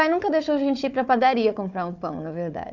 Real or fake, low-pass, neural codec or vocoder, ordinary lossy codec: fake; 7.2 kHz; codec, 16 kHz, 4 kbps, FunCodec, trained on Chinese and English, 50 frames a second; none